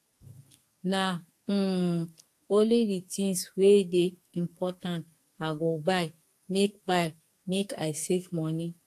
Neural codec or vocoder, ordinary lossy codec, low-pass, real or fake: codec, 44.1 kHz, 2.6 kbps, SNAC; AAC, 64 kbps; 14.4 kHz; fake